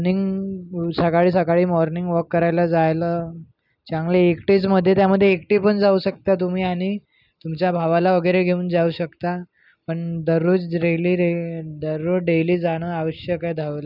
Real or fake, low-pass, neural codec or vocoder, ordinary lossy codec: real; 5.4 kHz; none; none